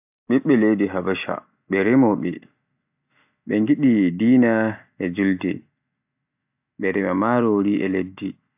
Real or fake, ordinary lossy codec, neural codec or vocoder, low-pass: real; AAC, 32 kbps; none; 3.6 kHz